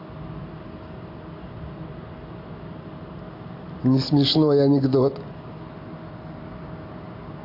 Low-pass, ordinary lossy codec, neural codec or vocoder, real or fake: 5.4 kHz; AAC, 32 kbps; none; real